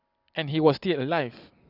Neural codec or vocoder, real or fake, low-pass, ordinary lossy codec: none; real; 5.4 kHz; none